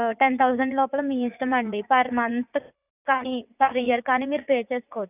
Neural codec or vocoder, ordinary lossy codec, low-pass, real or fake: vocoder, 44.1 kHz, 80 mel bands, Vocos; none; 3.6 kHz; fake